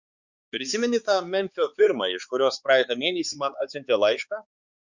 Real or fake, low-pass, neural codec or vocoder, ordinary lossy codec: fake; 7.2 kHz; codec, 16 kHz, 4 kbps, X-Codec, HuBERT features, trained on balanced general audio; Opus, 64 kbps